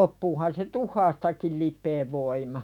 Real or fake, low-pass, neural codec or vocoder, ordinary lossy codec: fake; 19.8 kHz; autoencoder, 48 kHz, 128 numbers a frame, DAC-VAE, trained on Japanese speech; none